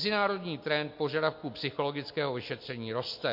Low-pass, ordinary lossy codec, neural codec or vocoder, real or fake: 5.4 kHz; MP3, 32 kbps; none; real